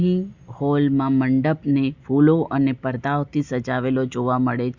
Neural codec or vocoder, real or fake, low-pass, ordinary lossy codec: none; real; 7.2 kHz; Opus, 64 kbps